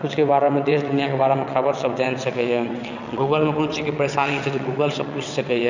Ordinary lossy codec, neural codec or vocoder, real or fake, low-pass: none; vocoder, 22.05 kHz, 80 mel bands, WaveNeXt; fake; 7.2 kHz